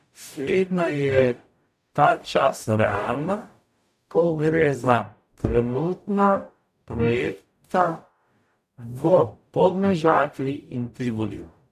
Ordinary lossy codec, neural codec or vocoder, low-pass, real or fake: none; codec, 44.1 kHz, 0.9 kbps, DAC; 14.4 kHz; fake